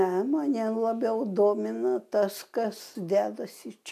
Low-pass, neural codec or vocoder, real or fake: 14.4 kHz; none; real